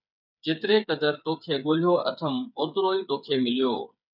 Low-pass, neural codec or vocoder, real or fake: 5.4 kHz; codec, 16 kHz, 8 kbps, FreqCodec, smaller model; fake